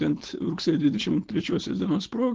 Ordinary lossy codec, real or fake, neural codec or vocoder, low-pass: Opus, 16 kbps; fake; codec, 16 kHz, 4.8 kbps, FACodec; 7.2 kHz